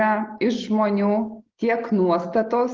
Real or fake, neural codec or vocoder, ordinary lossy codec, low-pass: real; none; Opus, 16 kbps; 7.2 kHz